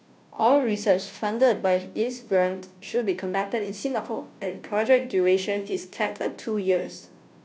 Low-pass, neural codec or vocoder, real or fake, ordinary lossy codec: none; codec, 16 kHz, 0.5 kbps, FunCodec, trained on Chinese and English, 25 frames a second; fake; none